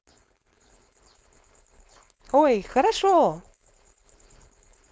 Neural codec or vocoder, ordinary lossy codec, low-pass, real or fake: codec, 16 kHz, 4.8 kbps, FACodec; none; none; fake